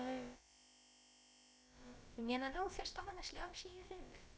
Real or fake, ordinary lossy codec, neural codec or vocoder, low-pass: fake; none; codec, 16 kHz, about 1 kbps, DyCAST, with the encoder's durations; none